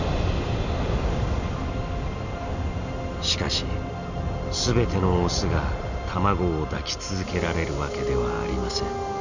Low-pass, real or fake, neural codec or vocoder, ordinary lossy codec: 7.2 kHz; real; none; none